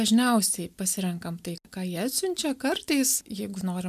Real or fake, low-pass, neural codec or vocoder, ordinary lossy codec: real; 14.4 kHz; none; MP3, 96 kbps